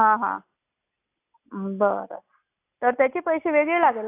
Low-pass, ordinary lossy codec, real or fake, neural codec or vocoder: 3.6 kHz; AAC, 24 kbps; real; none